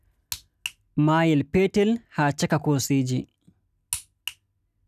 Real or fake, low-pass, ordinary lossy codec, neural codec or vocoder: real; 14.4 kHz; none; none